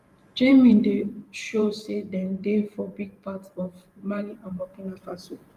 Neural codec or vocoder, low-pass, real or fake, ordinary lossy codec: vocoder, 44.1 kHz, 128 mel bands every 512 samples, BigVGAN v2; 14.4 kHz; fake; Opus, 32 kbps